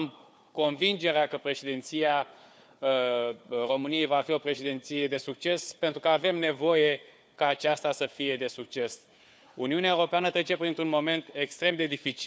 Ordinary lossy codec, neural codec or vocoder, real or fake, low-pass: none; codec, 16 kHz, 4 kbps, FunCodec, trained on Chinese and English, 50 frames a second; fake; none